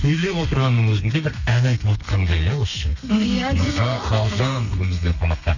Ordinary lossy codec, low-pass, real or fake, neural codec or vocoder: none; 7.2 kHz; fake; codec, 32 kHz, 1.9 kbps, SNAC